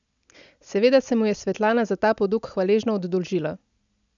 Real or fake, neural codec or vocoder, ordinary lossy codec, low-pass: real; none; none; 7.2 kHz